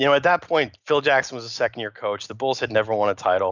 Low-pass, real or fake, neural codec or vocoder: 7.2 kHz; real; none